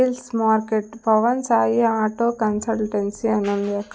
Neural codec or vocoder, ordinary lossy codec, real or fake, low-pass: none; none; real; none